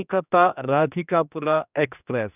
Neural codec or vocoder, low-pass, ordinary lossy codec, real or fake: codec, 16 kHz, 1 kbps, X-Codec, HuBERT features, trained on general audio; 3.6 kHz; none; fake